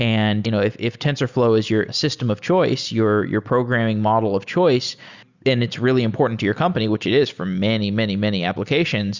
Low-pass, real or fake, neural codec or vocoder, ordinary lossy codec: 7.2 kHz; real; none; Opus, 64 kbps